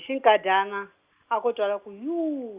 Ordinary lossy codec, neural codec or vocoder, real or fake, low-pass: Opus, 64 kbps; none; real; 3.6 kHz